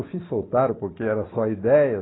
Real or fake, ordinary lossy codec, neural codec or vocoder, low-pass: real; AAC, 16 kbps; none; 7.2 kHz